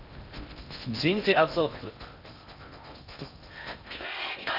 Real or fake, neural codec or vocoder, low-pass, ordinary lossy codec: fake; codec, 16 kHz in and 24 kHz out, 0.6 kbps, FocalCodec, streaming, 4096 codes; 5.4 kHz; none